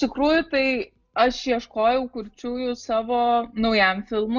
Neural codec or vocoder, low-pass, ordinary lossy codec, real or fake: none; 7.2 kHz; Opus, 64 kbps; real